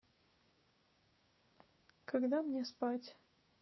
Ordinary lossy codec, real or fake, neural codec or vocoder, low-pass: MP3, 24 kbps; fake; codec, 16 kHz, 6 kbps, DAC; 7.2 kHz